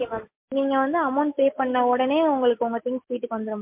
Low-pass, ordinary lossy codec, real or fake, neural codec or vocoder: 3.6 kHz; MP3, 32 kbps; real; none